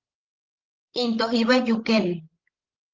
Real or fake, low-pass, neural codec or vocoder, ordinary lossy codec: fake; 7.2 kHz; codec, 16 kHz, 16 kbps, FreqCodec, larger model; Opus, 16 kbps